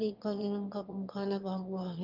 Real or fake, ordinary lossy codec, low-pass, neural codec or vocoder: fake; Opus, 24 kbps; 5.4 kHz; autoencoder, 22.05 kHz, a latent of 192 numbers a frame, VITS, trained on one speaker